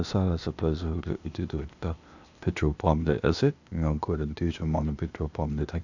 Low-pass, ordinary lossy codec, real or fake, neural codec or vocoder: 7.2 kHz; none; fake; codec, 16 kHz, 0.8 kbps, ZipCodec